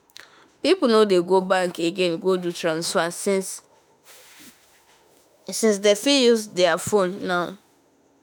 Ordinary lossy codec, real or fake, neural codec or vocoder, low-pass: none; fake; autoencoder, 48 kHz, 32 numbers a frame, DAC-VAE, trained on Japanese speech; none